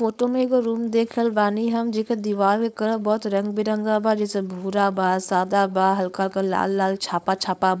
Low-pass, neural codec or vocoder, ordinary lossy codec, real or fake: none; codec, 16 kHz, 4.8 kbps, FACodec; none; fake